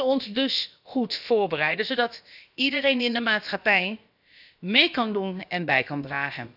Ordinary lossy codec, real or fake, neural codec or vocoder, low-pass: none; fake; codec, 16 kHz, about 1 kbps, DyCAST, with the encoder's durations; 5.4 kHz